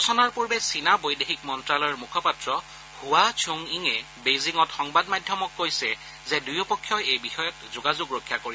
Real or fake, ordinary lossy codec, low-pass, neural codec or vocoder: real; none; none; none